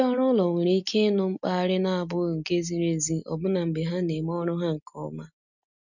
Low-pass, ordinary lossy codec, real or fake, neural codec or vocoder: 7.2 kHz; none; real; none